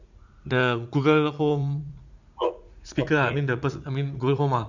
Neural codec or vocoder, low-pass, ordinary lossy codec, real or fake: vocoder, 44.1 kHz, 80 mel bands, Vocos; 7.2 kHz; none; fake